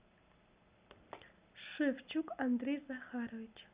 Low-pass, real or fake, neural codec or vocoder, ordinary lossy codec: 3.6 kHz; real; none; Opus, 64 kbps